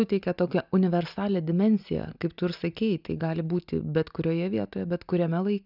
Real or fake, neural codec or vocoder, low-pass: real; none; 5.4 kHz